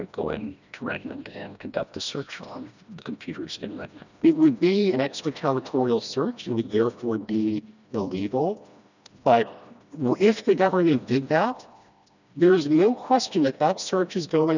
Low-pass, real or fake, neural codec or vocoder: 7.2 kHz; fake; codec, 16 kHz, 1 kbps, FreqCodec, smaller model